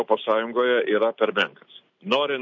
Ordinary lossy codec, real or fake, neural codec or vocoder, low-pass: MP3, 48 kbps; real; none; 7.2 kHz